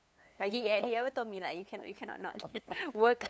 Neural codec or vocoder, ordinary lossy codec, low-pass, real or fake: codec, 16 kHz, 2 kbps, FunCodec, trained on LibriTTS, 25 frames a second; none; none; fake